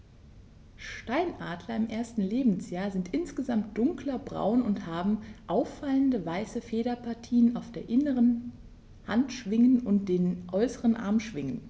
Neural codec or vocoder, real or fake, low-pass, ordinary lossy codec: none; real; none; none